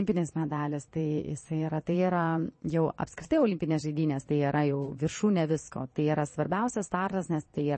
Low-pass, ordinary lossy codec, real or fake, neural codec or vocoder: 9.9 kHz; MP3, 32 kbps; fake; vocoder, 22.05 kHz, 80 mel bands, Vocos